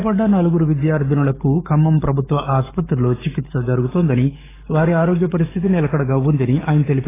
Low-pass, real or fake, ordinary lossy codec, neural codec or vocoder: 3.6 kHz; fake; AAC, 16 kbps; codec, 44.1 kHz, 7.8 kbps, DAC